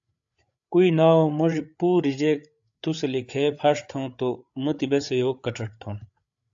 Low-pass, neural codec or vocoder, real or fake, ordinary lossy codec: 7.2 kHz; codec, 16 kHz, 8 kbps, FreqCodec, larger model; fake; AAC, 64 kbps